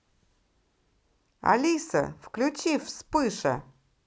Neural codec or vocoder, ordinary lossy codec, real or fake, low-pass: none; none; real; none